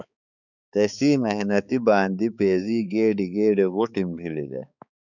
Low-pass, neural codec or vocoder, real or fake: 7.2 kHz; codec, 16 kHz, 4 kbps, X-Codec, HuBERT features, trained on balanced general audio; fake